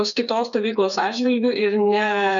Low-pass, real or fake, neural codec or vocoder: 7.2 kHz; fake; codec, 16 kHz, 4 kbps, FreqCodec, smaller model